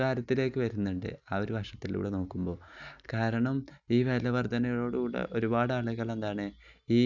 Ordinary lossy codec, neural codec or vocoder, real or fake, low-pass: none; none; real; 7.2 kHz